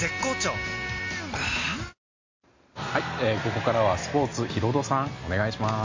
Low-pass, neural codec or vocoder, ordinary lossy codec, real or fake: 7.2 kHz; none; MP3, 48 kbps; real